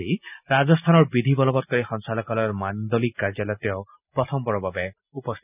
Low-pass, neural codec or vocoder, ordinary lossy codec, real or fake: 3.6 kHz; none; none; real